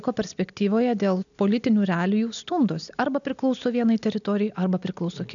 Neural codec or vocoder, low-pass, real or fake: none; 7.2 kHz; real